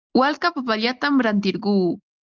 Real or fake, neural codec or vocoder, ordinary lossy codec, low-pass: real; none; Opus, 32 kbps; 7.2 kHz